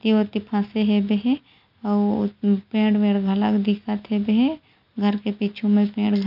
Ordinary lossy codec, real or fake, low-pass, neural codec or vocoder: none; real; 5.4 kHz; none